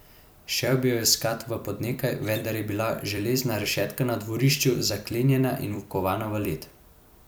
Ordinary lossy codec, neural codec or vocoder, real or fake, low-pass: none; vocoder, 44.1 kHz, 128 mel bands every 256 samples, BigVGAN v2; fake; none